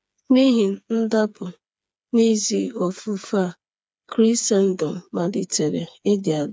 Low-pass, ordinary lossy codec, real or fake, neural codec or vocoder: none; none; fake; codec, 16 kHz, 4 kbps, FreqCodec, smaller model